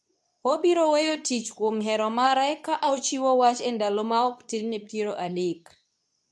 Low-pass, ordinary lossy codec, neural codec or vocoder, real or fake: none; none; codec, 24 kHz, 0.9 kbps, WavTokenizer, medium speech release version 2; fake